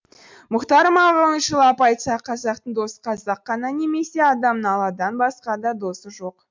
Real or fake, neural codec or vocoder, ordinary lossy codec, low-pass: real; none; MP3, 64 kbps; 7.2 kHz